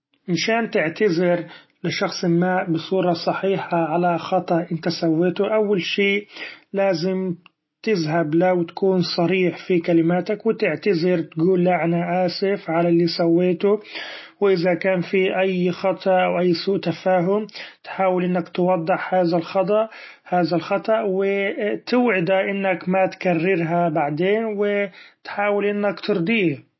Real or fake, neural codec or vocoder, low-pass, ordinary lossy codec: real; none; 7.2 kHz; MP3, 24 kbps